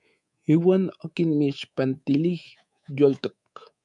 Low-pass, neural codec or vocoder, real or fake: 10.8 kHz; codec, 24 kHz, 3.1 kbps, DualCodec; fake